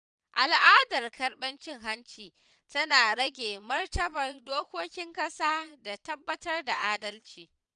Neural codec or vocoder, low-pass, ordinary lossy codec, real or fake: vocoder, 22.05 kHz, 80 mel bands, Vocos; 9.9 kHz; none; fake